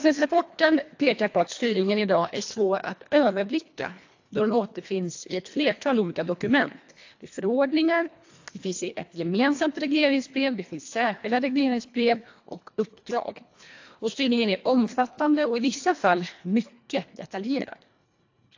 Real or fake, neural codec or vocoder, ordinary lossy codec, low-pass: fake; codec, 24 kHz, 1.5 kbps, HILCodec; AAC, 48 kbps; 7.2 kHz